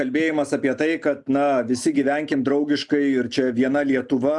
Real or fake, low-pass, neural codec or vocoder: real; 10.8 kHz; none